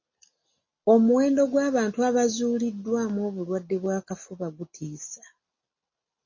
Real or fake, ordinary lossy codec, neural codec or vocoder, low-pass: real; MP3, 32 kbps; none; 7.2 kHz